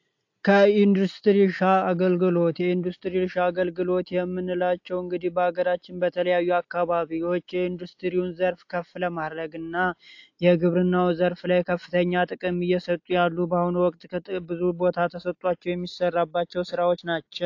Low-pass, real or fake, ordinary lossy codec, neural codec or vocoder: 7.2 kHz; real; AAC, 48 kbps; none